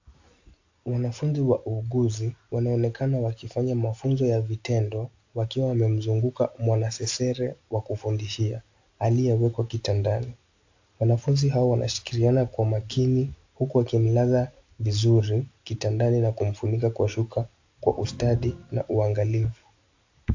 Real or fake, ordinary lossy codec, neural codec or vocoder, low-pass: real; AAC, 48 kbps; none; 7.2 kHz